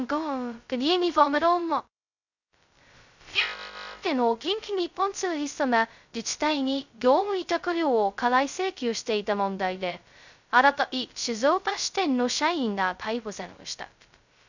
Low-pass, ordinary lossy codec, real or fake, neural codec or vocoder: 7.2 kHz; none; fake; codec, 16 kHz, 0.2 kbps, FocalCodec